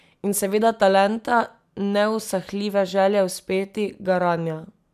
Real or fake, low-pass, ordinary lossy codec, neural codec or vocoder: real; 14.4 kHz; none; none